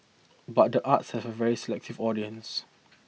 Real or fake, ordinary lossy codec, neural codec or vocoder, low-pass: real; none; none; none